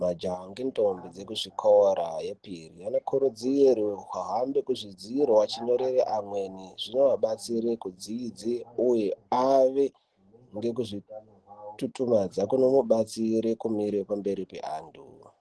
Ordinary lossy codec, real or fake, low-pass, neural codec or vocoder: Opus, 16 kbps; real; 10.8 kHz; none